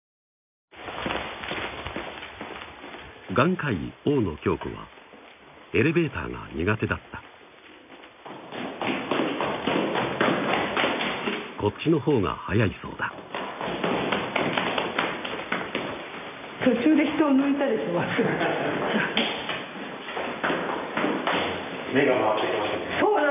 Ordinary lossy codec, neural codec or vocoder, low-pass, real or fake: none; none; 3.6 kHz; real